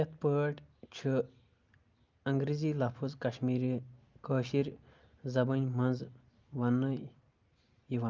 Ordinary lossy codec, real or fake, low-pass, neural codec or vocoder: Opus, 24 kbps; real; 7.2 kHz; none